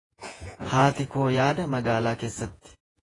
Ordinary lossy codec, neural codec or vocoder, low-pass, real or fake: AAC, 32 kbps; vocoder, 48 kHz, 128 mel bands, Vocos; 10.8 kHz; fake